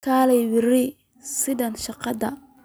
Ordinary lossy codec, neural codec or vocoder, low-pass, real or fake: none; none; none; real